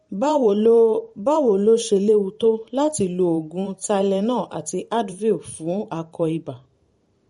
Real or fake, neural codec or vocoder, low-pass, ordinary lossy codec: fake; vocoder, 44.1 kHz, 128 mel bands every 512 samples, BigVGAN v2; 19.8 kHz; MP3, 48 kbps